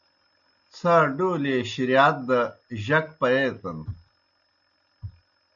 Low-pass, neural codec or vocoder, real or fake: 7.2 kHz; none; real